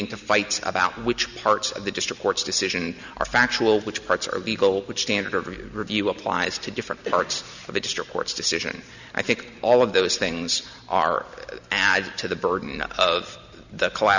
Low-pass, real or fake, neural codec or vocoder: 7.2 kHz; real; none